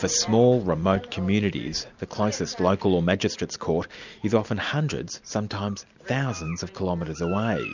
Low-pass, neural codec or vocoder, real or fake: 7.2 kHz; none; real